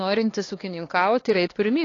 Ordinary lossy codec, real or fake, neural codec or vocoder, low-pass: AAC, 32 kbps; fake; codec, 16 kHz, 2 kbps, X-Codec, HuBERT features, trained on LibriSpeech; 7.2 kHz